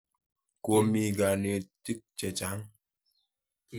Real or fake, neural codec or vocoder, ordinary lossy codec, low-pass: real; none; none; none